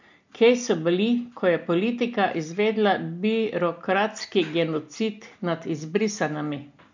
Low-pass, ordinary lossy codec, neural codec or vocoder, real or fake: 7.2 kHz; MP3, 48 kbps; none; real